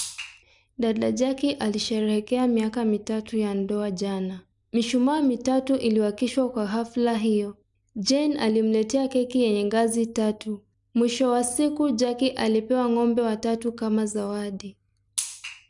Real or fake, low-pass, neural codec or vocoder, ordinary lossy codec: real; 10.8 kHz; none; none